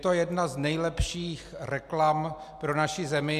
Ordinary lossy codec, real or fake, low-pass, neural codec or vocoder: AAC, 96 kbps; real; 14.4 kHz; none